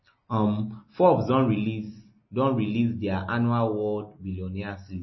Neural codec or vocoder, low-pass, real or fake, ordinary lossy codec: none; 7.2 kHz; real; MP3, 24 kbps